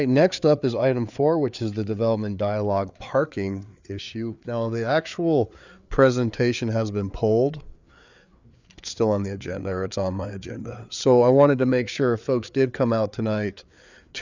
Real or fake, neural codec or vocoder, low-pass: fake; codec, 16 kHz, 4 kbps, FreqCodec, larger model; 7.2 kHz